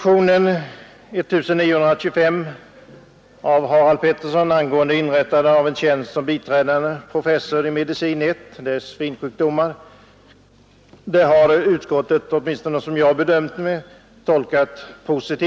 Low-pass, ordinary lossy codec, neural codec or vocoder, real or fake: none; none; none; real